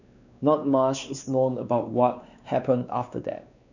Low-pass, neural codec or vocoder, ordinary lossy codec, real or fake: 7.2 kHz; codec, 16 kHz, 2 kbps, X-Codec, WavLM features, trained on Multilingual LibriSpeech; none; fake